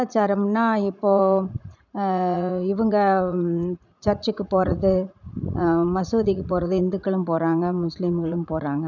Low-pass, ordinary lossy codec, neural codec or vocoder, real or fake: 7.2 kHz; none; vocoder, 44.1 kHz, 80 mel bands, Vocos; fake